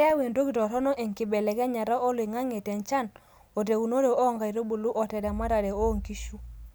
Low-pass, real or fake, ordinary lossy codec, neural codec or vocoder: none; real; none; none